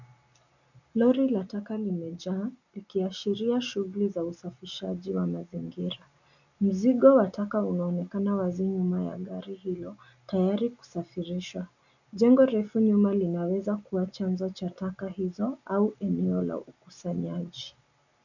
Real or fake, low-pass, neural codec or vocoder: real; 7.2 kHz; none